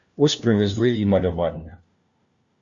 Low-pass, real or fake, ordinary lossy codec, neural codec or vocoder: 7.2 kHz; fake; Opus, 64 kbps; codec, 16 kHz, 1 kbps, FunCodec, trained on LibriTTS, 50 frames a second